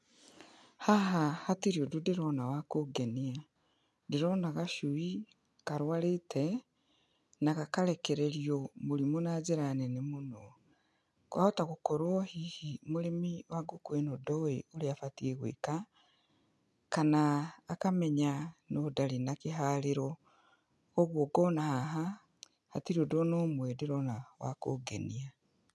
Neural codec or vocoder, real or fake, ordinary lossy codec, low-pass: none; real; none; none